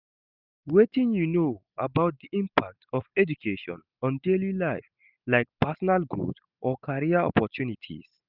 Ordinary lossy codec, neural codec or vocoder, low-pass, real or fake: none; none; 5.4 kHz; real